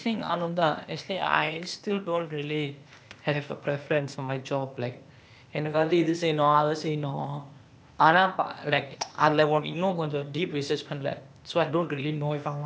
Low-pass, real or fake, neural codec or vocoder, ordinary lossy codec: none; fake; codec, 16 kHz, 0.8 kbps, ZipCodec; none